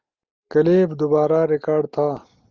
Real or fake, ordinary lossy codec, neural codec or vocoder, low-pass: real; Opus, 32 kbps; none; 7.2 kHz